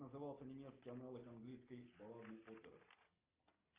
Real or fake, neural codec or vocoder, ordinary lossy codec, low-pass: real; none; Opus, 32 kbps; 3.6 kHz